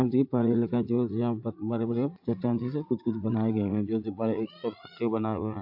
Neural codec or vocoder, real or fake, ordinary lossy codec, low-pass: vocoder, 44.1 kHz, 80 mel bands, Vocos; fake; AAC, 48 kbps; 5.4 kHz